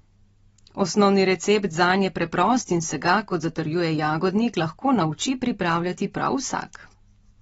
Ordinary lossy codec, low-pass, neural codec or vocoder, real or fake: AAC, 24 kbps; 19.8 kHz; none; real